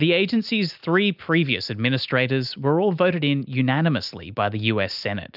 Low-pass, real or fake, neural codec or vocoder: 5.4 kHz; real; none